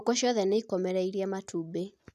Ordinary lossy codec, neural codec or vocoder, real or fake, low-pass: none; none; real; 14.4 kHz